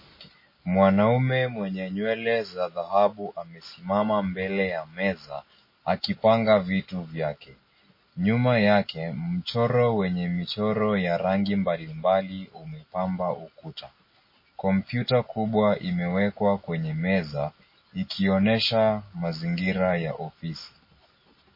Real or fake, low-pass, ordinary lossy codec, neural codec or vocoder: real; 5.4 kHz; MP3, 24 kbps; none